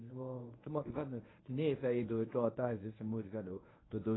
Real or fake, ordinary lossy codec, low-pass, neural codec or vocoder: fake; AAC, 16 kbps; 7.2 kHz; codec, 24 kHz, 0.9 kbps, WavTokenizer, medium speech release version 1